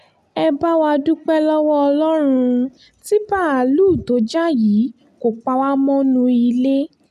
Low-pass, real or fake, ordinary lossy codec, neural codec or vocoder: 14.4 kHz; real; none; none